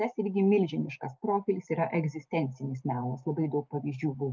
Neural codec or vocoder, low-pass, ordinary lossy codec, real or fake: none; 7.2 kHz; Opus, 24 kbps; real